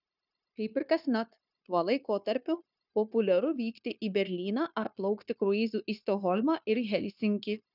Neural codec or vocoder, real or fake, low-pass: codec, 16 kHz, 0.9 kbps, LongCat-Audio-Codec; fake; 5.4 kHz